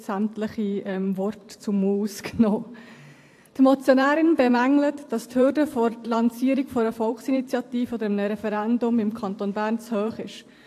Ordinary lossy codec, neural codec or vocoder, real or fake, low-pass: AAC, 64 kbps; vocoder, 44.1 kHz, 128 mel bands every 512 samples, BigVGAN v2; fake; 14.4 kHz